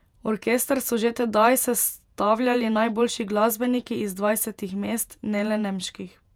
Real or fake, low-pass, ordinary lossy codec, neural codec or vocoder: fake; 19.8 kHz; none; vocoder, 48 kHz, 128 mel bands, Vocos